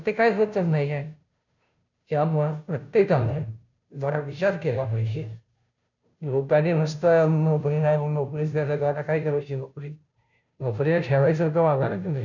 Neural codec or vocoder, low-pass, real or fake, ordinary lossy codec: codec, 16 kHz, 0.5 kbps, FunCodec, trained on Chinese and English, 25 frames a second; 7.2 kHz; fake; none